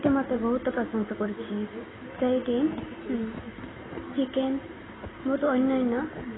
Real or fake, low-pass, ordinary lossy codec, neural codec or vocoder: real; 7.2 kHz; AAC, 16 kbps; none